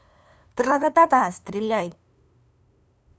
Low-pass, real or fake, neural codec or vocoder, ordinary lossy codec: none; fake; codec, 16 kHz, 2 kbps, FunCodec, trained on LibriTTS, 25 frames a second; none